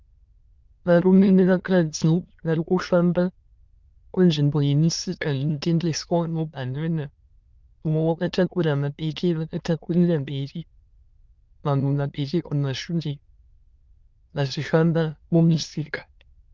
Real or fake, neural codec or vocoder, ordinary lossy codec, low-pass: fake; autoencoder, 22.05 kHz, a latent of 192 numbers a frame, VITS, trained on many speakers; Opus, 24 kbps; 7.2 kHz